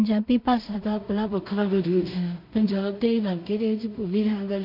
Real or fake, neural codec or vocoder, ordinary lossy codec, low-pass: fake; codec, 16 kHz in and 24 kHz out, 0.4 kbps, LongCat-Audio-Codec, two codebook decoder; none; 5.4 kHz